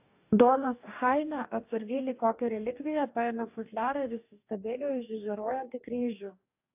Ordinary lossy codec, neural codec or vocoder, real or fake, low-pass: AAC, 32 kbps; codec, 44.1 kHz, 2.6 kbps, DAC; fake; 3.6 kHz